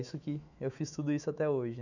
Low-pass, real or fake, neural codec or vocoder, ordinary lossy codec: 7.2 kHz; fake; autoencoder, 48 kHz, 128 numbers a frame, DAC-VAE, trained on Japanese speech; none